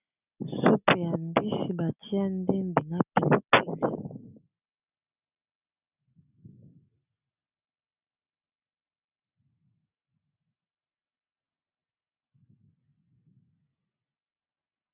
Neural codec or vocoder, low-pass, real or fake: none; 3.6 kHz; real